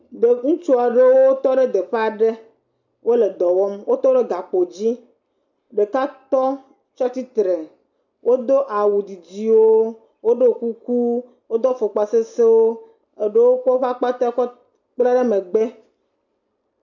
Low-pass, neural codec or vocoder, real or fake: 7.2 kHz; none; real